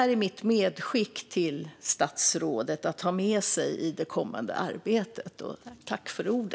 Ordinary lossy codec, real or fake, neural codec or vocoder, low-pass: none; real; none; none